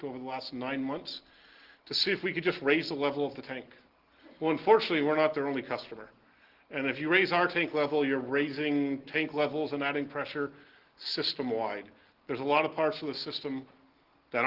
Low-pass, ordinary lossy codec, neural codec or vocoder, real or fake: 5.4 kHz; Opus, 16 kbps; none; real